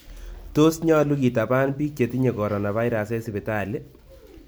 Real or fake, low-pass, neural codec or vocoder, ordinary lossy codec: real; none; none; none